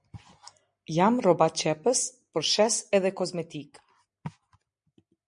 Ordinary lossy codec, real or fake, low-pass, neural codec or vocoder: MP3, 64 kbps; real; 9.9 kHz; none